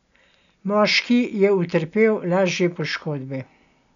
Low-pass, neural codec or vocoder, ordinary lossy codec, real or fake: 7.2 kHz; none; none; real